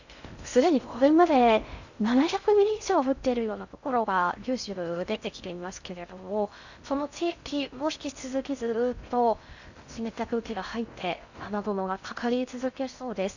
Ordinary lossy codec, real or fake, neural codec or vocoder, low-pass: none; fake; codec, 16 kHz in and 24 kHz out, 0.6 kbps, FocalCodec, streaming, 2048 codes; 7.2 kHz